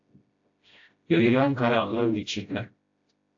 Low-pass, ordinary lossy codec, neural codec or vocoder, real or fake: 7.2 kHz; AAC, 48 kbps; codec, 16 kHz, 0.5 kbps, FreqCodec, smaller model; fake